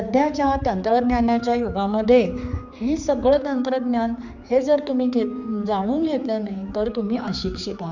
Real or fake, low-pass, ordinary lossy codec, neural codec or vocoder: fake; 7.2 kHz; none; codec, 16 kHz, 4 kbps, X-Codec, HuBERT features, trained on general audio